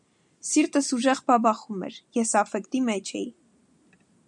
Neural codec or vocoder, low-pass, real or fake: none; 10.8 kHz; real